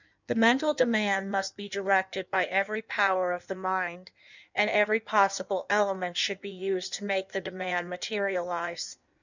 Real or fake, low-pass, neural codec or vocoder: fake; 7.2 kHz; codec, 16 kHz in and 24 kHz out, 1.1 kbps, FireRedTTS-2 codec